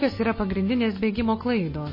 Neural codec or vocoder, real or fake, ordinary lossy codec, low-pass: none; real; MP3, 24 kbps; 5.4 kHz